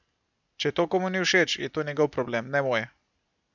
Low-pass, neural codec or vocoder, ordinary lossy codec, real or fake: 7.2 kHz; none; none; real